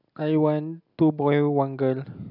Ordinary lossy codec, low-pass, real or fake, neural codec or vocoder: none; 5.4 kHz; real; none